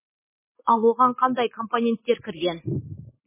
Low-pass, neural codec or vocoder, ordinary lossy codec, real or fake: 3.6 kHz; none; MP3, 16 kbps; real